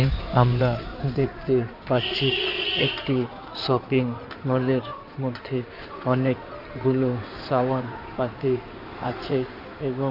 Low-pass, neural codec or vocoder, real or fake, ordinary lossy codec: 5.4 kHz; codec, 16 kHz in and 24 kHz out, 2.2 kbps, FireRedTTS-2 codec; fake; none